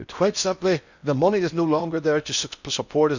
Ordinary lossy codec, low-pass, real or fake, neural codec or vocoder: none; 7.2 kHz; fake; codec, 16 kHz in and 24 kHz out, 0.6 kbps, FocalCodec, streaming, 4096 codes